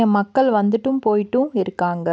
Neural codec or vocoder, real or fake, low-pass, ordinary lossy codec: none; real; none; none